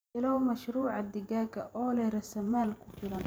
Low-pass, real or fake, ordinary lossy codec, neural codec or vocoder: none; fake; none; vocoder, 44.1 kHz, 128 mel bands every 512 samples, BigVGAN v2